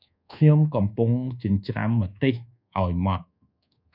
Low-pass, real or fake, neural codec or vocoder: 5.4 kHz; fake; codec, 24 kHz, 1.2 kbps, DualCodec